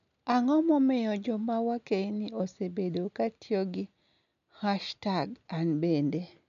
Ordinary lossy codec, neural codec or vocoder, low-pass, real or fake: none; none; 7.2 kHz; real